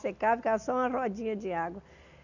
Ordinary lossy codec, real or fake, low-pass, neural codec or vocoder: none; real; 7.2 kHz; none